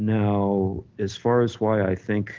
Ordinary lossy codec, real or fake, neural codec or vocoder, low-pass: Opus, 16 kbps; real; none; 7.2 kHz